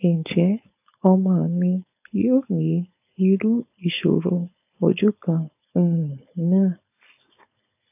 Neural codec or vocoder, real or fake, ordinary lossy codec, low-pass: codec, 16 kHz, 4.8 kbps, FACodec; fake; AAC, 32 kbps; 3.6 kHz